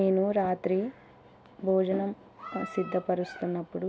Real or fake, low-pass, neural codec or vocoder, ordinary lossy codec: real; none; none; none